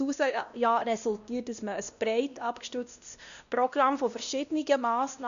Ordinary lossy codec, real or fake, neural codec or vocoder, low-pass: none; fake; codec, 16 kHz, 1 kbps, X-Codec, WavLM features, trained on Multilingual LibriSpeech; 7.2 kHz